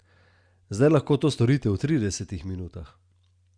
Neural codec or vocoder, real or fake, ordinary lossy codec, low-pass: none; real; Opus, 64 kbps; 9.9 kHz